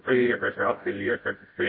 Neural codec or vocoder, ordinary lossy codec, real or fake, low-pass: codec, 16 kHz, 0.5 kbps, FreqCodec, smaller model; MP3, 24 kbps; fake; 5.4 kHz